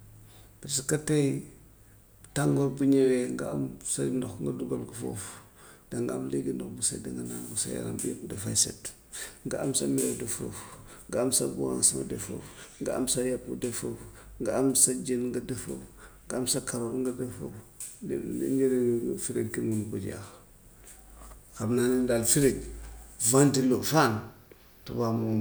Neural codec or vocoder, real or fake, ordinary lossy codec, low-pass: none; real; none; none